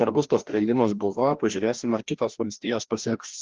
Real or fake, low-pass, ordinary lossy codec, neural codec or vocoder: fake; 7.2 kHz; Opus, 16 kbps; codec, 16 kHz, 1 kbps, FreqCodec, larger model